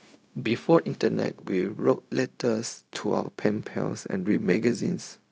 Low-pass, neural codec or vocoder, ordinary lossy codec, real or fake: none; codec, 16 kHz, 0.4 kbps, LongCat-Audio-Codec; none; fake